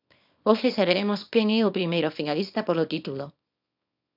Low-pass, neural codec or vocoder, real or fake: 5.4 kHz; codec, 24 kHz, 0.9 kbps, WavTokenizer, small release; fake